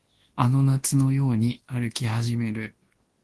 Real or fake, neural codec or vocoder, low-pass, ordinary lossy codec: fake; codec, 24 kHz, 0.9 kbps, WavTokenizer, large speech release; 10.8 kHz; Opus, 16 kbps